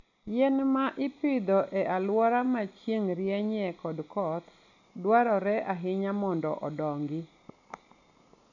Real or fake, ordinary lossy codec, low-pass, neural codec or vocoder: real; none; 7.2 kHz; none